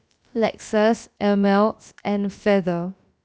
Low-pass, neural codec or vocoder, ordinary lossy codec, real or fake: none; codec, 16 kHz, about 1 kbps, DyCAST, with the encoder's durations; none; fake